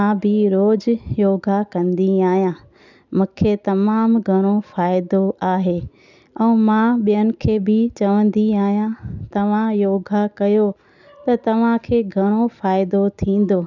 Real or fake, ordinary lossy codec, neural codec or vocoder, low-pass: real; none; none; 7.2 kHz